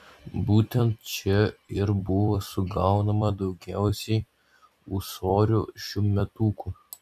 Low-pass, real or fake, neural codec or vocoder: 14.4 kHz; fake; vocoder, 44.1 kHz, 128 mel bands every 256 samples, BigVGAN v2